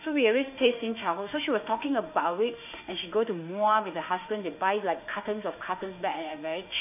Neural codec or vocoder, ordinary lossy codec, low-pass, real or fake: autoencoder, 48 kHz, 32 numbers a frame, DAC-VAE, trained on Japanese speech; none; 3.6 kHz; fake